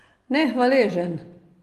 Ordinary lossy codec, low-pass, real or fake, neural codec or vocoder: Opus, 24 kbps; 14.4 kHz; real; none